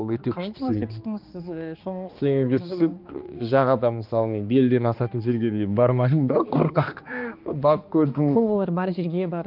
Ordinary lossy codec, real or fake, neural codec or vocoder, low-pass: Opus, 32 kbps; fake; codec, 16 kHz, 2 kbps, X-Codec, HuBERT features, trained on balanced general audio; 5.4 kHz